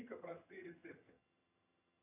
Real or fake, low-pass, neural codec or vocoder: fake; 3.6 kHz; vocoder, 22.05 kHz, 80 mel bands, HiFi-GAN